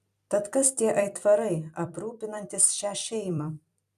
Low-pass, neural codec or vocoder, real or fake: 14.4 kHz; vocoder, 44.1 kHz, 128 mel bands every 256 samples, BigVGAN v2; fake